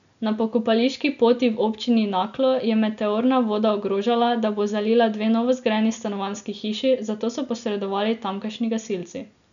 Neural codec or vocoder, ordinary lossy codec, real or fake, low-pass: none; none; real; 7.2 kHz